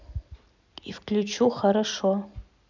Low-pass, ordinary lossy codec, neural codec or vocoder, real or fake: 7.2 kHz; none; none; real